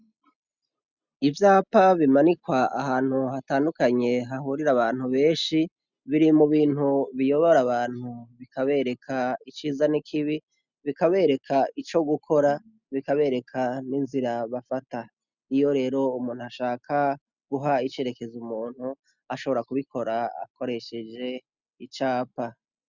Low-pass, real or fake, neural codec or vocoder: 7.2 kHz; real; none